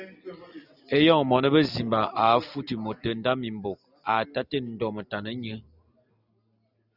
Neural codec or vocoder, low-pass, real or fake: none; 5.4 kHz; real